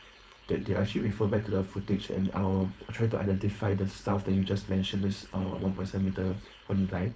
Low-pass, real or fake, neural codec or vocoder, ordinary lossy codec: none; fake; codec, 16 kHz, 4.8 kbps, FACodec; none